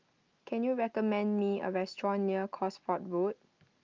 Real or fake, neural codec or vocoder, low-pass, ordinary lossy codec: real; none; 7.2 kHz; Opus, 24 kbps